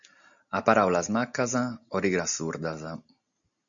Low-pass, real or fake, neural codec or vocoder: 7.2 kHz; real; none